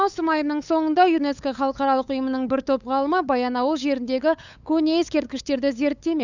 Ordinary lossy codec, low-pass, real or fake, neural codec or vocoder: none; 7.2 kHz; fake; codec, 16 kHz, 8 kbps, FunCodec, trained on LibriTTS, 25 frames a second